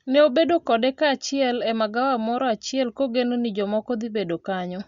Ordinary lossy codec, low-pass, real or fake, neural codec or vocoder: Opus, 64 kbps; 7.2 kHz; real; none